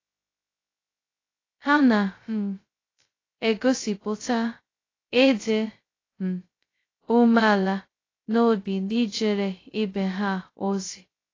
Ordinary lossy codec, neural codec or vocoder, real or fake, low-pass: AAC, 32 kbps; codec, 16 kHz, 0.2 kbps, FocalCodec; fake; 7.2 kHz